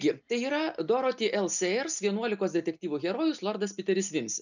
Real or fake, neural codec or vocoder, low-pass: real; none; 7.2 kHz